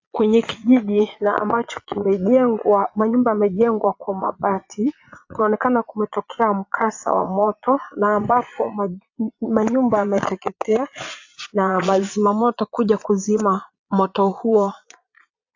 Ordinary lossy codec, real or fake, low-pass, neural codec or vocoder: AAC, 48 kbps; fake; 7.2 kHz; vocoder, 22.05 kHz, 80 mel bands, Vocos